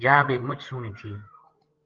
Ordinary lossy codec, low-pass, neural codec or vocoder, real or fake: Opus, 16 kbps; 7.2 kHz; codec, 16 kHz, 16 kbps, FreqCodec, larger model; fake